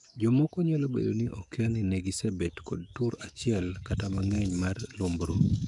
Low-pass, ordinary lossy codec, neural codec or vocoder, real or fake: 10.8 kHz; none; codec, 44.1 kHz, 7.8 kbps, DAC; fake